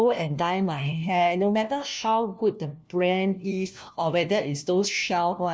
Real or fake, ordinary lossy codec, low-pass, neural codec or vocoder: fake; none; none; codec, 16 kHz, 1 kbps, FunCodec, trained on LibriTTS, 50 frames a second